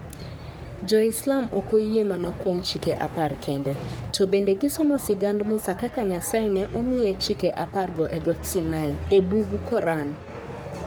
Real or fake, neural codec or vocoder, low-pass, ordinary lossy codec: fake; codec, 44.1 kHz, 3.4 kbps, Pupu-Codec; none; none